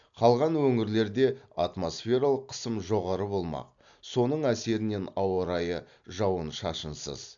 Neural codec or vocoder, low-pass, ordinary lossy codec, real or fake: none; 7.2 kHz; AAC, 64 kbps; real